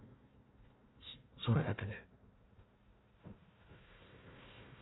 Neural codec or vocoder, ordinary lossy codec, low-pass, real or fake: codec, 16 kHz, 1 kbps, FunCodec, trained on Chinese and English, 50 frames a second; AAC, 16 kbps; 7.2 kHz; fake